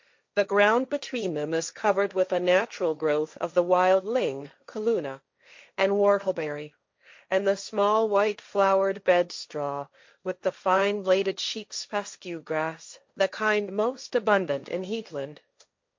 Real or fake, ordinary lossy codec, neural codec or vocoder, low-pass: fake; MP3, 48 kbps; codec, 16 kHz, 1.1 kbps, Voila-Tokenizer; 7.2 kHz